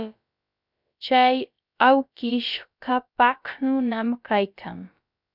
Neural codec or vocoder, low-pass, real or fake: codec, 16 kHz, about 1 kbps, DyCAST, with the encoder's durations; 5.4 kHz; fake